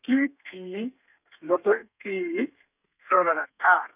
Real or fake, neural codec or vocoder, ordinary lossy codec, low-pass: fake; codec, 32 kHz, 1.9 kbps, SNAC; none; 3.6 kHz